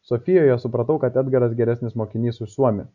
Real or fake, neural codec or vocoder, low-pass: real; none; 7.2 kHz